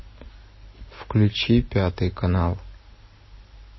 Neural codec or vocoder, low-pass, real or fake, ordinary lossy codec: none; 7.2 kHz; real; MP3, 24 kbps